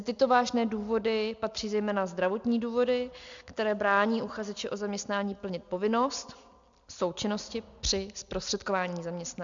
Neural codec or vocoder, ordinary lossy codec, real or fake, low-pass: none; MP3, 64 kbps; real; 7.2 kHz